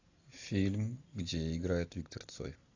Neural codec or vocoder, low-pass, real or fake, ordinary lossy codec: none; 7.2 kHz; real; AAC, 48 kbps